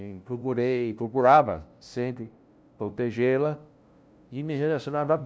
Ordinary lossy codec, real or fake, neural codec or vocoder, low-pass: none; fake; codec, 16 kHz, 0.5 kbps, FunCodec, trained on LibriTTS, 25 frames a second; none